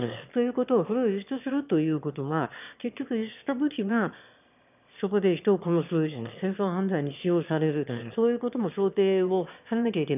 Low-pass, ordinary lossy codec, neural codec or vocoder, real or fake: 3.6 kHz; none; autoencoder, 22.05 kHz, a latent of 192 numbers a frame, VITS, trained on one speaker; fake